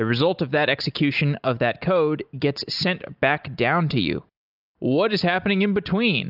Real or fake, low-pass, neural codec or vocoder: real; 5.4 kHz; none